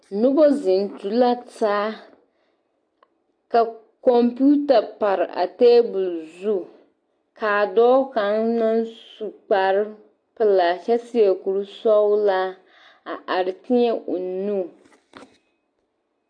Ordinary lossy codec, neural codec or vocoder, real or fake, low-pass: AAC, 48 kbps; none; real; 9.9 kHz